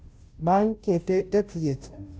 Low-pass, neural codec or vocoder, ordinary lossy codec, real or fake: none; codec, 16 kHz, 0.5 kbps, FunCodec, trained on Chinese and English, 25 frames a second; none; fake